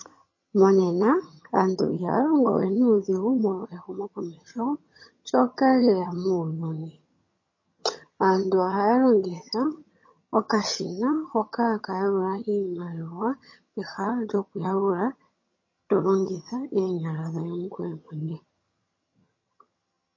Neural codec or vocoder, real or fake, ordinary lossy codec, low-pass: vocoder, 22.05 kHz, 80 mel bands, HiFi-GAN; fake; MP3, 32 kbps; 7.2 kHz